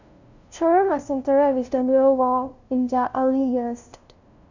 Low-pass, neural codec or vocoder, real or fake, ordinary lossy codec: 7.2 kHz; codec, 16 kHz, 0.5 kbps, FunCodec, trained on LibriTTS, 25 frames a second; fake; none